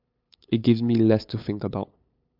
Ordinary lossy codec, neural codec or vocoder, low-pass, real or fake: MP3, 48 kbps; codec, 16 kHz, 8 kbps, FunCodec, trained on LibriTTS, 25 frames a second; 5.4 kHz; fake